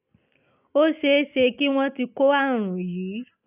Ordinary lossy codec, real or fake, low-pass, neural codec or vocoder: none; real; 3.6 kHz; none